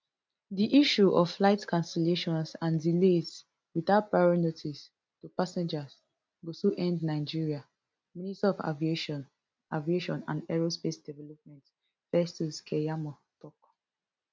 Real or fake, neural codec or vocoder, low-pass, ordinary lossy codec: real; none; none; none